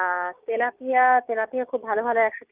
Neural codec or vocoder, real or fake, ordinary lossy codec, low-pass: codec, 16 kHz, 6 kbps, DAC; fake; Opus, 32 kbps; 3.6 kHz